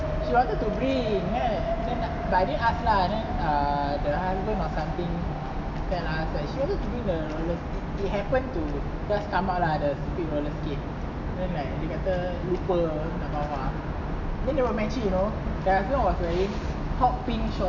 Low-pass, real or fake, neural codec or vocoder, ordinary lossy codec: 7.2 kHz; real; none; none